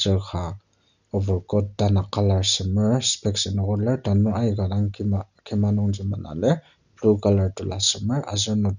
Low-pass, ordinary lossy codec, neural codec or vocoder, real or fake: 7.2 kHz; none; none; real